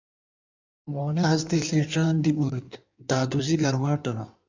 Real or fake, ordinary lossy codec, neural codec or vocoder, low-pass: fake; MP3, 64 kbps; codec, 16 kHz in and 24 kHz out, 1.1 kbps, FireRedTTS-2 codec; 7.2 kHz